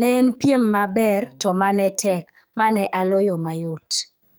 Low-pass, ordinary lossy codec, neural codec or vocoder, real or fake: none; none; codec, 44.1 kHz, 2.6 kbps, SNAC; fake